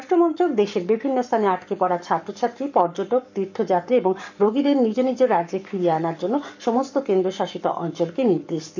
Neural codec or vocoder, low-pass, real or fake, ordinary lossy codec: codec, 44.1 kHz, 7.8 kbps, Pupu-Codec; 7.2 kHz; fake; none